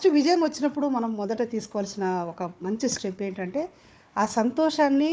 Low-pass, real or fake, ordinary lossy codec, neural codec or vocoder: none; fake; none; codec, 16 kHz, 4 kbps, FunCodec, trained on Chinese and English, 50 frames a second